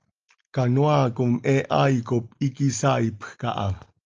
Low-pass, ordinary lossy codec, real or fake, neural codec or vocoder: 7.2 kHz; Opus, 24 kbps; real; none